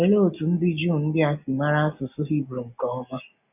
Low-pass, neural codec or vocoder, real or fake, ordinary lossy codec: 3.6 kHz; none; real; none